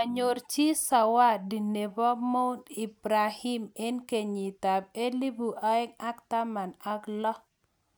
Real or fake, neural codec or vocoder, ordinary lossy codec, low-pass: real; none; none; none